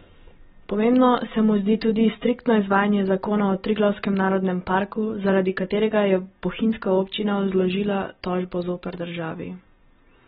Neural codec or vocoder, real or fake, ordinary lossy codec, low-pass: none; real; AAC, 16 kbps; 10.8 kHz